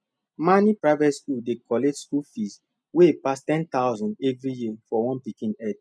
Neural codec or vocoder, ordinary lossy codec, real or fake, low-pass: none; none; real; none